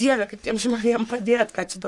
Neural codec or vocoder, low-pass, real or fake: codec, 44.1 kHz, 3.4 kbps, Pupu-Codec; 10.8 kHz; fake